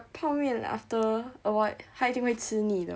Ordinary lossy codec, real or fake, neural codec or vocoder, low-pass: none; real; none; none